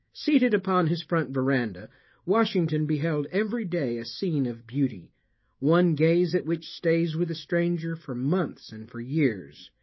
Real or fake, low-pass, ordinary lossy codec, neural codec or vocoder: fake; 7.2 kHz; MP3, 24 kbps; autoencoder, 48 kHz, 128 numbers a frame, DAC-VAE, trained on Japanese speech